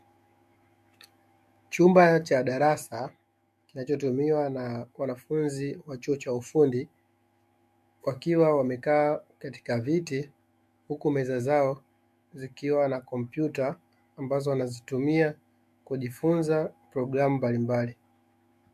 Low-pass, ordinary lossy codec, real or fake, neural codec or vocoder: 14.4 kHz; MP3, 64 kbps; fake; autoencoder, 48 kHz, 128 numbers a frame, DAC-VAE, trained on Japanese speech